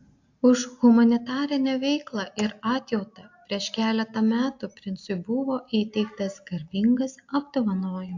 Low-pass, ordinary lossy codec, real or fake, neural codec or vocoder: 7.2 kHz; AAC, 48 kbps; real; none